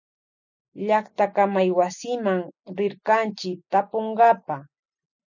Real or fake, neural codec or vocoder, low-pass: real; none; 7.2 kHz